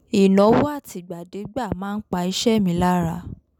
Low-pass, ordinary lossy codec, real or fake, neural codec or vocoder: 19.8 kHz; none; real; none